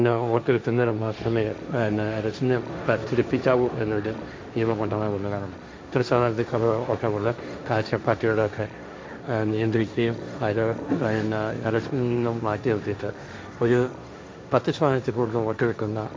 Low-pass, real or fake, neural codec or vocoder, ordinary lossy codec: none; fake; codec, 16 kHz, 1.1 kbps, Voila-Tokenizer; none